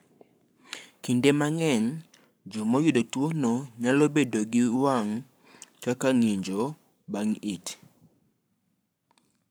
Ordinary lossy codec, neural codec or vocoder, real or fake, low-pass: none; codec, 44.1 kHz, 7.8 kbps, Pupu-Codec; fake; none